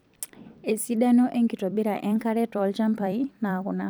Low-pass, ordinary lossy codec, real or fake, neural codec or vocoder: none; none; fake; vocoder, 44.1 kHz, 128 mel bands every 256 samples, BigVGAN v2